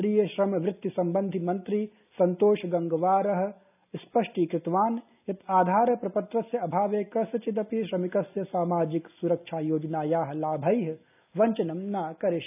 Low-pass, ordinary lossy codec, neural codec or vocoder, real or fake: 3.6 kHz; none; none; real